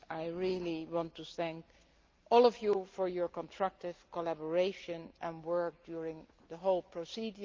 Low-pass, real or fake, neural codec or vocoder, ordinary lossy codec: 7.2 kHz; real; none; Opus, 24 kbps